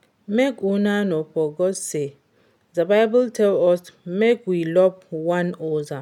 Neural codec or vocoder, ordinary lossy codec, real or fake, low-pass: none; none; real; 19.8 kHz